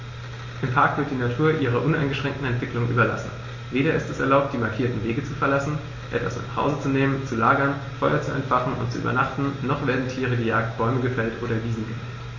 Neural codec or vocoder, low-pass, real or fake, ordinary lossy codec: none; 7.2 kHz; real; MP3, 32 kbps